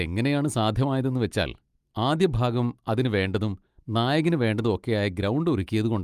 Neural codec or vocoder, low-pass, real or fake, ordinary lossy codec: none; 14.4 kHz; real; Opus, 32 kbps